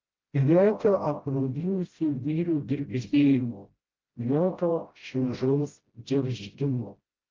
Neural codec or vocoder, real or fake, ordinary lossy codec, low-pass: codec, 16 kHz, 0.5 kbps, FreqCodec, smaller model; fake; Opus, 32 kbps; 7.2 kHz